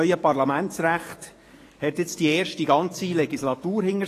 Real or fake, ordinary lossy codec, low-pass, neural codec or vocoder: fake; AAC, 48 kbps; 14.4 kHz; codec, 44.1 kHz, 7.8 kbps, DAC